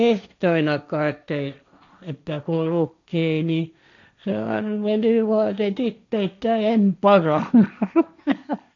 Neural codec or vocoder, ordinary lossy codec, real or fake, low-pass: codec, 16 kHz, 1.1 kbps, Voila-Tokenizer; none; fake; 7.2 kHz